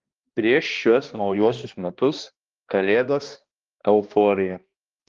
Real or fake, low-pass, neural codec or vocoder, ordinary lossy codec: fake; 7.2 kHz; codec, 16 kHz, 1 kbps, X-Codec, HuBERT features, trained on balanced general audio; Opus, 32 kbps